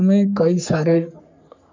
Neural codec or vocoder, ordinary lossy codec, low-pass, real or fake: codec, 44.1 kHz, 3.4 kbps, Pupu-Codec; MP3, 64 kbps; 7.2 kHz; fake